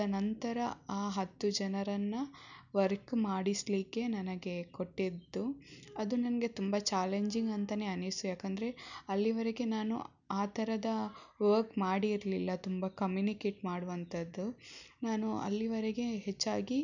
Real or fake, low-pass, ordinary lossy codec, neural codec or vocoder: real; 7.2 kHz; none; none